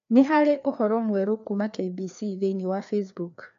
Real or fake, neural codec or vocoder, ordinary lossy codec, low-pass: fake; codec, 16 kHz, 2 kbps, FreqCodec, larger model; AAC, 64 kbps; 7.2 kHz